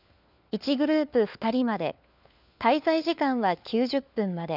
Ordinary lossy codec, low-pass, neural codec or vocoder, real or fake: none; 5.4 kHz; codec, 16 kHz, 4 kbps, FunCodec, trained on LibriTTS, 50 frames a second; fake